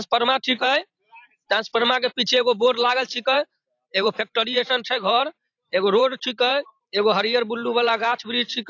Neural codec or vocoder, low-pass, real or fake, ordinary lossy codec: vocoder, 44.1 kHz, 128 mel bands every 512 samples, BigVGAN v2; 7.2 kHz; fake; AAC, 48 kbps